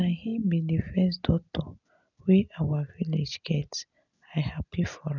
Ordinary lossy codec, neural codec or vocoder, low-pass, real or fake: none; none; 7.2 kHz; real